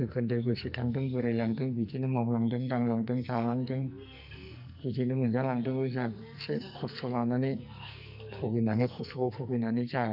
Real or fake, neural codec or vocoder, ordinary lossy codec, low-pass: fake; codec, 44.1 kHz, 2.6 kbps, SNAC; none; 5.4 kHz